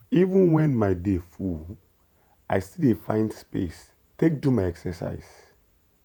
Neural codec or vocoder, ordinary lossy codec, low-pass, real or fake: vocoder, 48 kHz, 128 mel bands, Vocos; none; none; fake